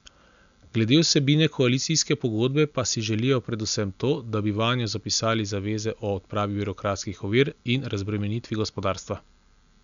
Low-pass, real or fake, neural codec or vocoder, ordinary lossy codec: 7.2 kHz; real; none; none